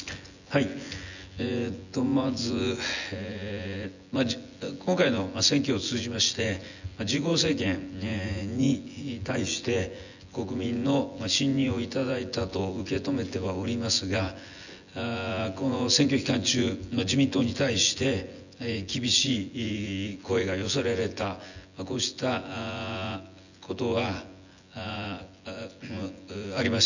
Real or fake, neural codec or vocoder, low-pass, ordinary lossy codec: fake; vocoder, 24 kHz, 100 mel bands, Vocos; 7.2 kHz; none